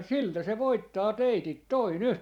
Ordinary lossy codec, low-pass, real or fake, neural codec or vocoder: none; 19.8 kHz; fake; vocoder, 44.1 kHz, 128 mel bands every 512 samples, BigVGAN v2